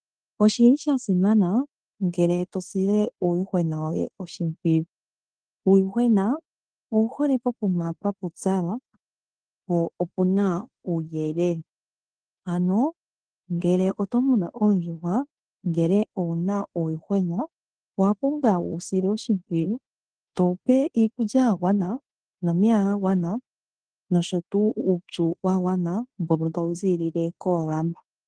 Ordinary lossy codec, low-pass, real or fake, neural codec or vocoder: Opus, 16 kbps; 9.9 kHz; fake; codec, 16 kHz in and 24 kHz out, 0.9 kbps, LongCat-Audio-Codec, fine tuned four codebook decoder